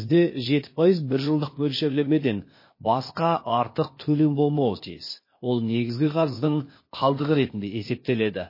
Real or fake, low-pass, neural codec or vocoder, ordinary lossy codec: fake; 5.4 kHz; codec, 16 kHz, 0.8 kbps, ZipCodec; MP3, 24 kbps